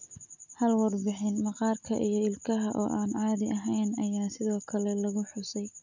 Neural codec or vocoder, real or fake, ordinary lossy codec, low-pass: none; real; none; 7.2 kHz